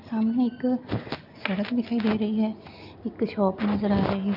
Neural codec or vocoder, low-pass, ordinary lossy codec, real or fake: none; 5.4 kHz; none; real